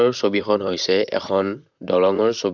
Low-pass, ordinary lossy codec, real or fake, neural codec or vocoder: 7.2 kHz; none; fake; vocoder, 44.1 kHz, 128 mel bands, Pupu-Vocoder